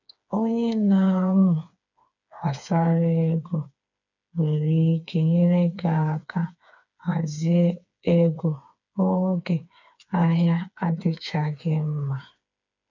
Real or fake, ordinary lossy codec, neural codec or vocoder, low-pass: fake; none; codec, 16 kHz, 4 kbps, FreqCodec, smaller model; 7.2 kHz